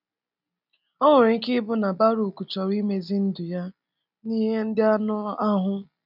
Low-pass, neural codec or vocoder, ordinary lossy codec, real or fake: 5.4 kHz; none; none; real